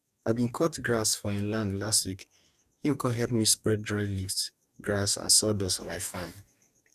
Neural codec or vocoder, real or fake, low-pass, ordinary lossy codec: codec, 44.1 kHz, 2.6 kbps, DAC; fake; 14.4 kHz; none